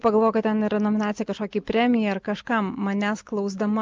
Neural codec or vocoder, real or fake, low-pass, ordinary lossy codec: none; real; 7.2 kHz; Opus, 24 kbps